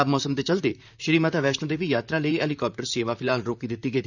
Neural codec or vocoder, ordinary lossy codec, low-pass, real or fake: vocoder, 44.1 kHz, 128 mel bands, Pupu-Vocoder; none; 7.2 kHz; fake